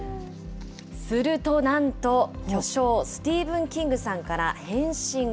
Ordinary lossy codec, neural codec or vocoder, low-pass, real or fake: none; none; none; real